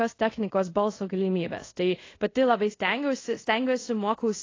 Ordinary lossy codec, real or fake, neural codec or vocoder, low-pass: AAC, 32 kbps; fake; codec, 24 kHz, 0.5 kbps, DualCodec; 7.2 kHz